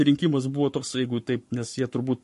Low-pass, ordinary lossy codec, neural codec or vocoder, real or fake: 14.4 kHz; MP3, 48 kbps; codec, 44.1 kHz, 7.8 kbps, Pupu-Codec; fake